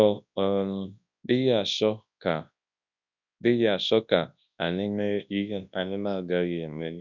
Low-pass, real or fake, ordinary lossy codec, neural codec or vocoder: 7.2 kHz; fake; none; codec, 24 kHz, 0.9 kbps, WavTokenizer, large speech release